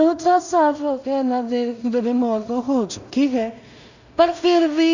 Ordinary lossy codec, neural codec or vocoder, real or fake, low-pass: none; codec, 16 kHz in and 24 kHz out, 0.4 kbps, LongCat-Audio-Codec, two codebook decoder; fake; 7.2 kHz